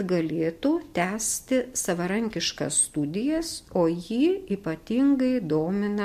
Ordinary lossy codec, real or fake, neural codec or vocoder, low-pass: MP3, 64 kbps; real; none; 14.4 kHz